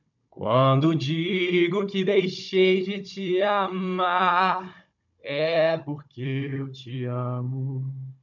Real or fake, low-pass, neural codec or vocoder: fake; 7.2 kHz; codec, 16 kHz, 16 kbps, FunCodec, trained on Chinese and English, 50 frames a second